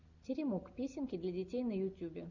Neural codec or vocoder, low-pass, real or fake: none; 7.2 kHz; real